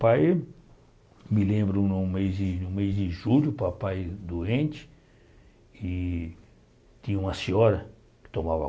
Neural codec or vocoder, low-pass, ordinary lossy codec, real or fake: none; none; none; real